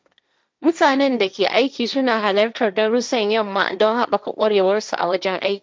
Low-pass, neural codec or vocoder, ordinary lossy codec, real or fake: none; codec, 16 kHz, 1.1 kbps, Voila-Tokenizer; none; fake